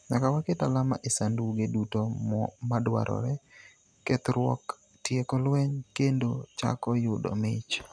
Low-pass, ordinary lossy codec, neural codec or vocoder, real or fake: none; none; none; real